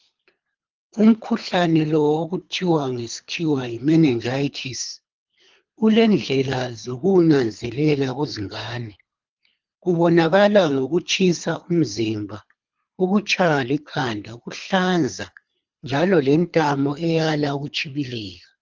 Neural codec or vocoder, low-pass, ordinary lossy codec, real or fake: codec, 24 kHz, 3 kbps, HILCodec; 7.2 kHz; Opus, 24 kbps; fake